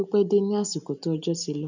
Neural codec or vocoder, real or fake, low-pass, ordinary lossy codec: none; real; 7.2 kHz; none